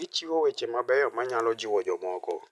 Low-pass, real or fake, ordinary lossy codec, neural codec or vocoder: none; real; none; none